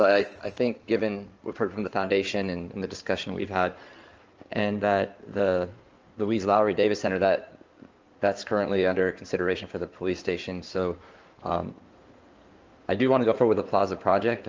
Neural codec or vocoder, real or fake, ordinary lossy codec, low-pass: codec, 16 kHz in and 24 kHz out, 2.2 kbps, FireRedTTS-2 codec; fake; Opus, 24 kbps; 7.2 kHz